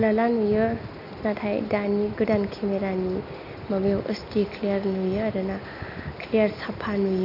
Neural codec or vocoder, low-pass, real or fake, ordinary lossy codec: none; 5.4 kHz; real; none